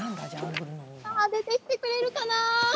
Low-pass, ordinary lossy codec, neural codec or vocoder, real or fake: none; none; none; real